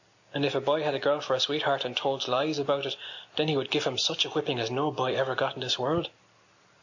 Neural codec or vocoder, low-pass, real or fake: none; 7.2 kHz; real